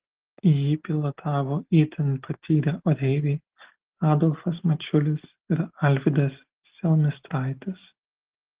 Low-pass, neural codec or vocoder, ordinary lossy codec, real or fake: 3.6 kHz; none; Opus, 16 kbps; real